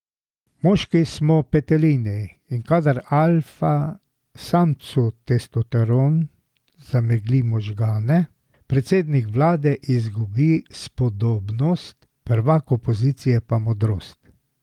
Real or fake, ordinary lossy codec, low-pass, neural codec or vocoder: real; Opus, 24 kbps; 19.8 kHz; none